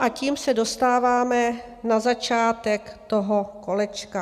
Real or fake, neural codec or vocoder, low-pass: real; none; 14.4 kHz